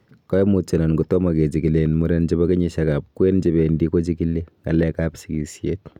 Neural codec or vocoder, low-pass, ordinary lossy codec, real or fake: none; 19.8 kHz; none; real